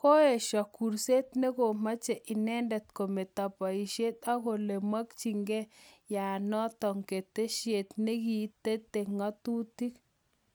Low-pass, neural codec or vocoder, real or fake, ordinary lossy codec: none; none; real; none